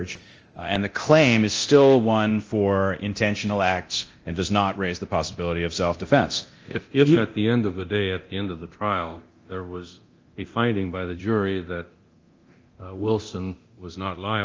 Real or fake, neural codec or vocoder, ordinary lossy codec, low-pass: fake; codec, 24 kHz, 0.9 kbps, DualCodec; Opus, 24 kbps; 7.2 kHz